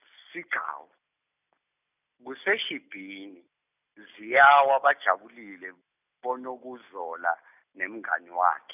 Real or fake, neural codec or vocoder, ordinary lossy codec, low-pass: real; none; none; 3.6 kHz